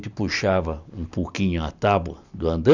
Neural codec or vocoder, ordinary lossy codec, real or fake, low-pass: none; none; real; 7.2 kHz